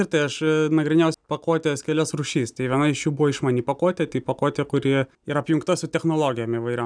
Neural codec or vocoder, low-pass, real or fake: none; 9.9 kHz; real